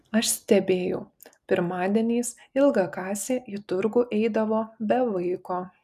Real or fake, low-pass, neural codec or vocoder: real; 14.4 kHz; none